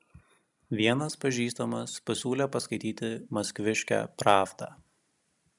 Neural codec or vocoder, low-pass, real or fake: none; 10.8 kHz; real